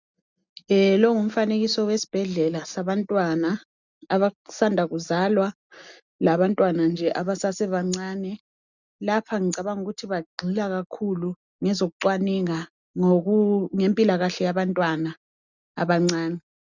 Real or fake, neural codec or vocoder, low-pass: real; none; 7.2 kHz